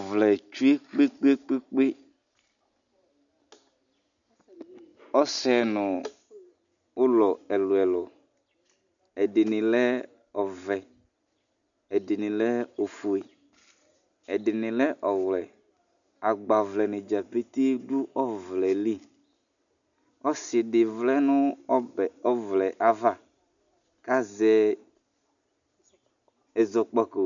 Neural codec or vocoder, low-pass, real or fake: none; 7.2 kHz; real